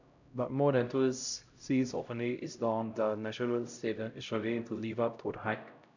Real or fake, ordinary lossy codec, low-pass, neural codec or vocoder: fake; none; 7.2 kHz; codec, 16 kHz, 0.5 kbps, X-Codec, HuBERT features, trained on LibriSpeech